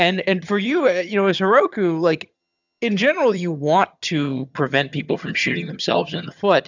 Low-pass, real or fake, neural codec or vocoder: 7.2 kHz; fake; vocoder, 22.05 kHz, 80 mel bands, HiFi-GAN